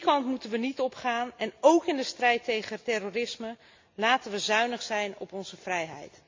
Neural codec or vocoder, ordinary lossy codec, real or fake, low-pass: none; MP3, 48 kbps; real; 7.2 kHz